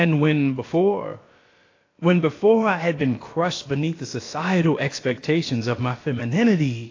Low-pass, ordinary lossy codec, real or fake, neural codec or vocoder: 7.2 kHz; AAC, 32 kbps; fake; codec, 16 kHz, about 1 kbps, DyCAST, with the encoder's durations